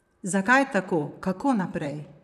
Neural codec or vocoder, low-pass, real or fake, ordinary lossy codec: vocoder, 44.1 kHz, 128 mel bands, Pupu-Vocoder; 14.4 kHz; fake; none